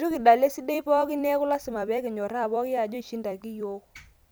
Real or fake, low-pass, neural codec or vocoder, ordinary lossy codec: fake; none; vocoder, 44.1 kHz, 128 mel bands every 256 samples, BigVGAN v2; none